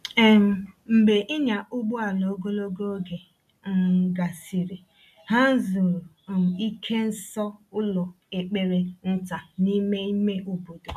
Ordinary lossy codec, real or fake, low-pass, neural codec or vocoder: none; real; 14.4 kHz; none